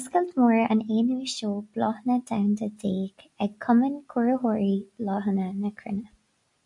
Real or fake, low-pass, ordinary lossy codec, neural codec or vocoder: real; 10.8 kHz; MP3, 64 kbps; none